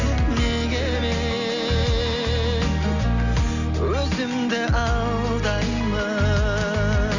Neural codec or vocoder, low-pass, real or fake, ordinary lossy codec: none; 7.2 kHz; real; none